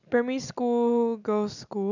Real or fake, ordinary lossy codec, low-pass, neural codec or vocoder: real; none; 7.2 kHz; none